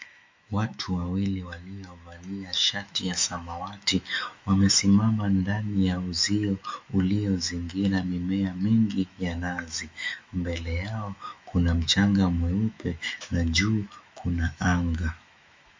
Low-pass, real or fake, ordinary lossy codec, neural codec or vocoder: 7.2 kHz; fake; MP3, 64 kbps; autoencoder, 48 kHz, 128 numbers a frame, DAC-VAE, trained on Japanese speech